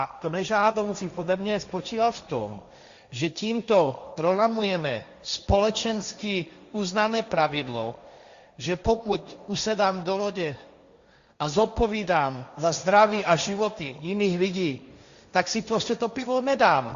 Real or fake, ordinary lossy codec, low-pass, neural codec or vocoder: fake; Opus, 64 kbps; 7.2 kHz; codec, 16 kHz, 1.1 kbps, Voila-Tokenizer